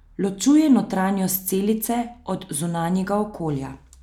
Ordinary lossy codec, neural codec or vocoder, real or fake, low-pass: none; none; real; 19.8 kHz